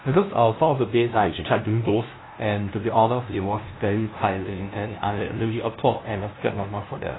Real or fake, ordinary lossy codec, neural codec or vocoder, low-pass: fake; AAC, 16 kbps; codec, 16 kHz, 0.5 kbps, FunCodec, trained on LibriTTS, 25 frames a second; 7.2 kHz